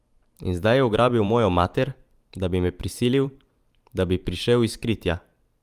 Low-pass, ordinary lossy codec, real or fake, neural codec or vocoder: 14.4 kHz; Opus, 32 kbps; fake; vocoder, 44.1 kHz, 128 mel bands every 512 samples, BigVGAN v2